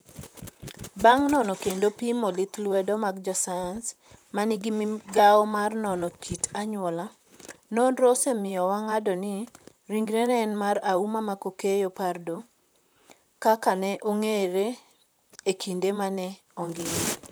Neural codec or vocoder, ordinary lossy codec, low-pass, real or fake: vocoder, 44.1 kHz, 128 mel bands, Pupu-Vocoder; none; none; fake